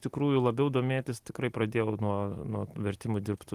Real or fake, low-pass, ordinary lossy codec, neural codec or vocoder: real; 14.4 kHz; Opus, 24 kbps; none